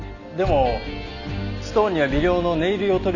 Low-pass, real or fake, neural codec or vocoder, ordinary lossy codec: 7.2 kHz; real; none; none